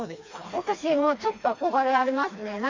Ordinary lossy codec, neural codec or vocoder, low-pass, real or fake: AAC, 48 kbps; codec, 16 kHz, 2 kbps, FreqCodec, smaller model; 7.2 kHz; fake